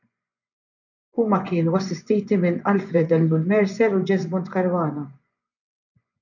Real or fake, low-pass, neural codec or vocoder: fake; 7.2 kHz; vocoder, 24 kHz, 100 mel bands, Vocos